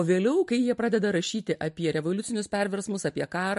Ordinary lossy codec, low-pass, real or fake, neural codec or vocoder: MP3, 48 kbps; 14.4 kHz; real; none